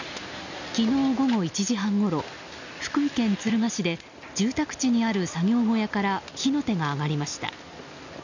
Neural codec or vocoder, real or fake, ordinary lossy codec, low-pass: none; real; none; 7.2 kHz